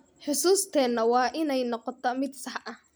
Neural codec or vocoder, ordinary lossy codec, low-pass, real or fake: none; none; none; real